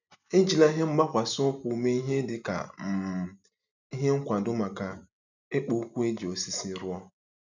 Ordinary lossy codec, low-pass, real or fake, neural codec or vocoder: none; 7.2 kHz; real; none